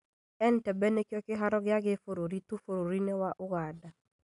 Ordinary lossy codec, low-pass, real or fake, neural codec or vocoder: MP3, 96 kbps; 10.8 kHz; real; none